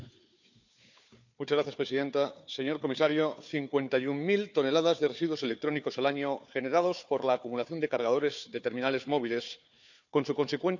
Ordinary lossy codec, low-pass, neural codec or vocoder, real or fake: none; 7.2 kHz; codec, 16 kHz, 4 kbps, FunCodec, trained on Chinese and English, 50 frames a second; fake